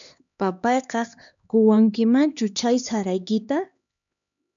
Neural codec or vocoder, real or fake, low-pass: codec, 16 kHz, 2 kbps, X-Codec, HuBERT features, trained on LibriSpeech; fake; 7.2 kHz